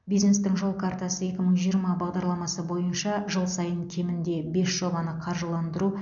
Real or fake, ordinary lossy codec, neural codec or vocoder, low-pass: real; none; none; 7.2 kHz